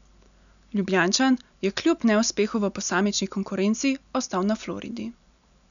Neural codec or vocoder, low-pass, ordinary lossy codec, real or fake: none; 7.2 kHz; none; real